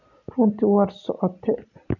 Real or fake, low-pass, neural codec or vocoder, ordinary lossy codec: real; 7.2 kHz; none; none